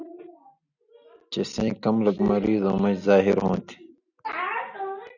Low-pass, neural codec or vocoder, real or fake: 7.2 kHz; none; real